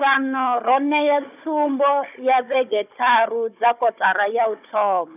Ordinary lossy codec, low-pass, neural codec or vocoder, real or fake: none; 3.6 kHz; codec, 16 kHz, 16 kbps, FunCodec, trained on Chinese and English, 50 frames a second; fake